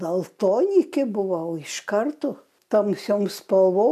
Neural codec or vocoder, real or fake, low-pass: none; real; 14.4 kHz